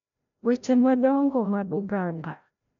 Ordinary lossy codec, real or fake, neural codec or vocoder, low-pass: none; fake; codec, 16 kHz, 0.5 kbps, FreqCodec, larger model; 7.2 kHz